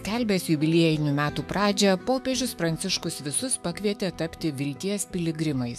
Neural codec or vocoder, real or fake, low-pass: autoencoder, 48 kHz, 128 numbers a frame, DAC-VAE, trained on Japanese speech; fake; 14.4 kHz